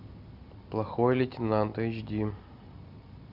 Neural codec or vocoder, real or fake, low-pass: none; real; 5.4 kHz